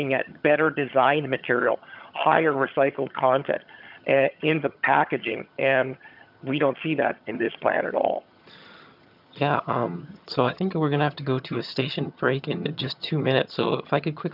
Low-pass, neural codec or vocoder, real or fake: 5.4 kHz; vocoder, 22.05 kHz, 80 mel bands, HiFi-GAN; fake